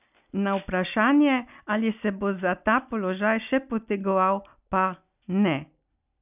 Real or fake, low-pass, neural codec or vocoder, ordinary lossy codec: real; 3.6 kHz; none; none